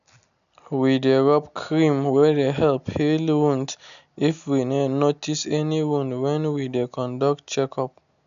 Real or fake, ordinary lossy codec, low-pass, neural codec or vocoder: real; none; 7.2 kHz; none